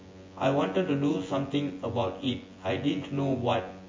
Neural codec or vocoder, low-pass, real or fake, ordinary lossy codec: vocoder, 24 kHz, 100 mel bands, Vocos; 7.2 kHz; fake; MP3, 32 kbps